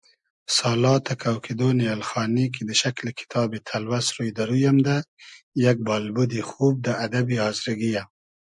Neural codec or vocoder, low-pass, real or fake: none; 10.8 kHz; real